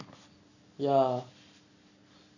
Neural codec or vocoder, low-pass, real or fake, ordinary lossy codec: none; 7.2 kHz; real; none